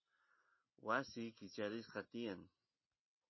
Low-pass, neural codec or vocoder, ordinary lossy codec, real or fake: 7.2 kHz; none; MP3, 24 kbps; real